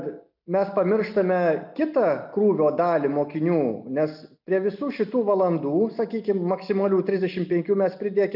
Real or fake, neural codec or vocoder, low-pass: real; none; 5.4 kHz